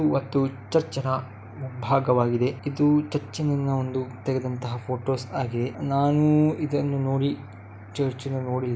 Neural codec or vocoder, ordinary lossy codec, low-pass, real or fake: none; none; none; real